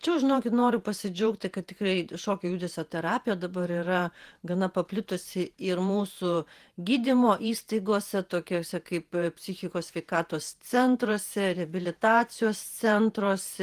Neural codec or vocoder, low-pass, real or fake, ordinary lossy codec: vocoder, 48 kHz, 128 mel bands, Vocos; 14.4 kHz; fake; Opus, 24 kbps